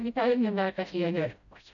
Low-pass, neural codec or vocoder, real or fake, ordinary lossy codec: 7.2 kHz; codec, 16 kHz, 0.5 kbps, FreqCodec, smaller model; fake; none